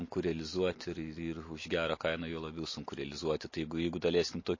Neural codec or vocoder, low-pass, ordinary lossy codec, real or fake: none; 7.2 kHz; MP3, 32 kbps; real